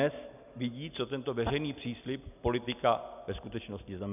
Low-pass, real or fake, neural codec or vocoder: 3.6 kHz; real; none